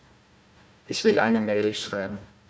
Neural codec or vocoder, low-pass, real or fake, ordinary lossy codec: codec, 16 kHz, 1 kbps, FunCodec, trained on Chinese and English, 50 frames a second; none; fake; none